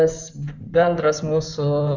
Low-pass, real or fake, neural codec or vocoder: 7.2 kHz; fake; codec, 16 kHz in and 24 kHz out, 2.2 kbps, FireRedTTS-2 codec